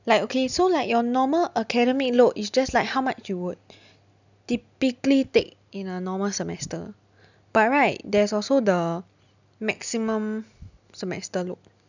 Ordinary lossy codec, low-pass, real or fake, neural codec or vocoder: none; 7.2 kHz; real; none